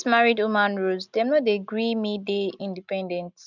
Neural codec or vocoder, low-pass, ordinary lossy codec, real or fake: none; 7.2 kHz; none; real